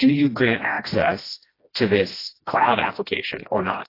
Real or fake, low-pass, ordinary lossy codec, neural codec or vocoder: fake; 5.4 kHz; AAC, 24 kbps; codec, 16 kHz, 1 kbps, FreqCodec, smaller model